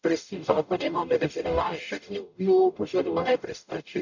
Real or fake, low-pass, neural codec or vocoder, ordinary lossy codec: fake; 7.2 kHz; codec, 44.1 kHz, 0.9 kbps, DAC; none